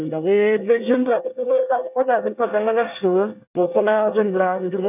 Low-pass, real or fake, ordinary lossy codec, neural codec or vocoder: 3.6 kHz; fake; none; codec, 24 kHz, 1 kbps, SNAC